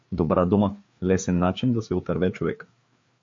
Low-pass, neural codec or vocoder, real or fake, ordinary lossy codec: 7.2 kHz; codec, 16 kHz, 4 kbps, FreqCodec, larger model; fake; MP3, 48 kbps